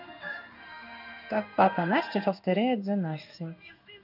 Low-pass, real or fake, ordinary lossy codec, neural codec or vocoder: 5.4 kHz; fake; none; codec, 16 kHz in and 24 kHz out, 1 kbps, XY-Tokenizer